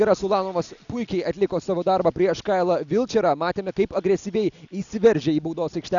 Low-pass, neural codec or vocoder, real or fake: 7.2 kHz; none; real